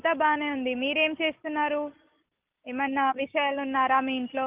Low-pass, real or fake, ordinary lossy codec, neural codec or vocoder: 3.6 kHz; real; Opus, 32 kbps; none